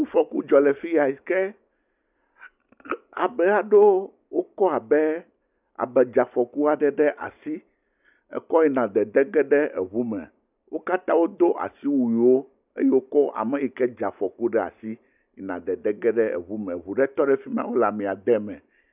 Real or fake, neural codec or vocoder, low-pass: real; none; 3.6 kHz